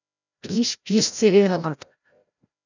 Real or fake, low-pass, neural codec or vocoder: fake; 7.2 kHz; codec, 16 kHz, 0.5 kbps, FreqCodec, larger model